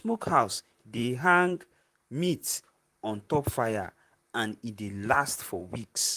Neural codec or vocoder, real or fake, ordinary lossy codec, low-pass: vocoder, 44.1 kHz, 128 mel bands, Pupu-Vocoder; fake; Opus, 32 kbps; 14.4 kHz